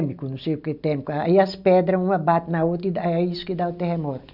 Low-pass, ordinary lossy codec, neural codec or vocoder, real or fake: 5.4 kHz; none; none; real